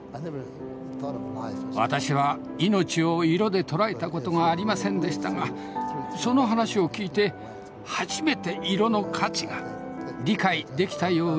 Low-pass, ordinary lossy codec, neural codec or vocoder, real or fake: none; none; none; real